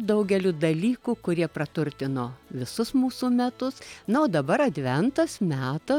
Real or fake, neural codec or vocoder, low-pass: real; none; 19.8 kHz